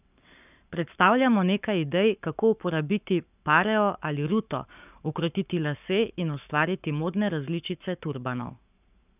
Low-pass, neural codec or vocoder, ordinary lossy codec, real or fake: 3.6 kHz; codec, 44.1 kHz, 7.8 kbps, Pupu-Codec; none; fake